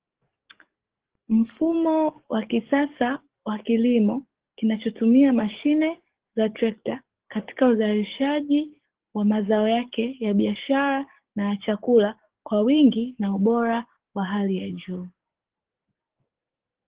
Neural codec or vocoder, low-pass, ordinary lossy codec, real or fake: codec, 44.1 kHz, 7.8 kbps, DAC; 3.6 kHz; Opus, 16 kbps; fake